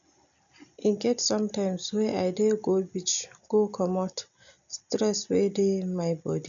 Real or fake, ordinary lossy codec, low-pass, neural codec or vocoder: real; none; 7.2 kHz; none